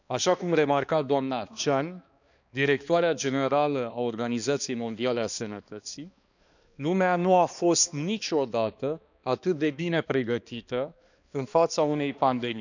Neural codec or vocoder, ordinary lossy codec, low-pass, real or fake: codec, 16 kHz, 2 kbps, X-Codec, HuBERT features, trained on balanced general audio; none; 7.2 kHz; fake